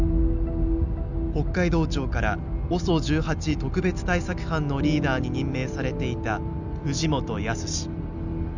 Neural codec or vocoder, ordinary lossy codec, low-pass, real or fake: none; none; 7.2 kHz; real